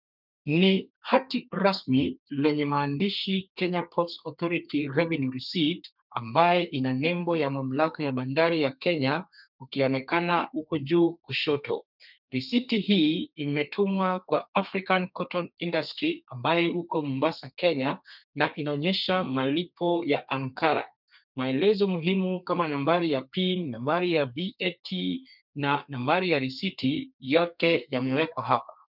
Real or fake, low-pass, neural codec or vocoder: fake; 5.4 kHz; codec, 32 kHz, 1.9 kbps, SNAC